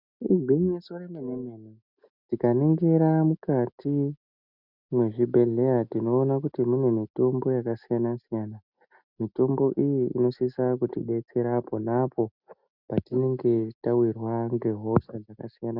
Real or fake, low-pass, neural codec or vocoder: real; 5.4 kHz; none